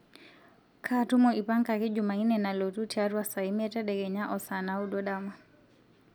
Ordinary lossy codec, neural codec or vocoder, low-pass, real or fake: none; none; none; real